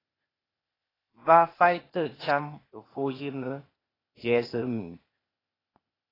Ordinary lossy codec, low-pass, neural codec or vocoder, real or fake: AAC, 24 kbps; 5.4 kHz; codec, 16 kHz, 0.8 kbps, ZipCodec; fake